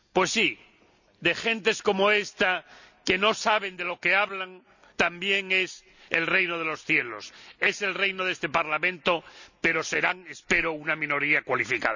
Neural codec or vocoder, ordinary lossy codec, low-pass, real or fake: none; none; 7.2 kHz; real